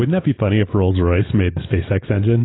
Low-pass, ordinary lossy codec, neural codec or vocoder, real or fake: 7.2 kHz; AAC, 16 kbps; none; real